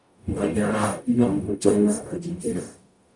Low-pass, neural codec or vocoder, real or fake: 10.8 kHz; codec, 44.1 kHz, 0.9 kbps, DAC; fake